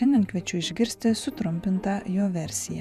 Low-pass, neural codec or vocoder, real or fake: 14.4 kHz; vocoder, 48 kHz, 128 mel bands, Vocos; fake